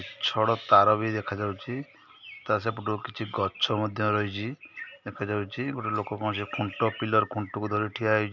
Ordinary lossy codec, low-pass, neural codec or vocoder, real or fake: none; 7.2 kHz; none; real